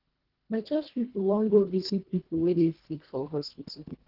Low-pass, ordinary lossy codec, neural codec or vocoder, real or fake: 5.4 kHz; Opus, 16 kbps; codec, 24 kHz, 1.5 kbps, HILCodec; fake